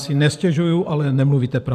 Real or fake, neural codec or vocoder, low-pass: fake; vocoder, 44.1 kHz, 128 mel bands every 512 samples, BigVGAN v2; 14.4 kHz